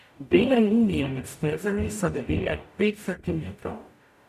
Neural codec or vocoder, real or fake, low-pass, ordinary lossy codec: codec, 44.1 kHz, 0.9 kbps, DAC; fake; 14.4 kHz; none